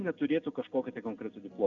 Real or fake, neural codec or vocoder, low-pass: real; none; 7.2 kHz